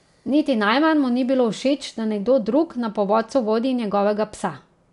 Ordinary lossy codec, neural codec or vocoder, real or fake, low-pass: none; none; real; 10.8 kHz